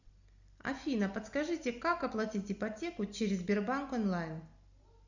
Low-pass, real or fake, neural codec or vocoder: 7.2 kHz; real; none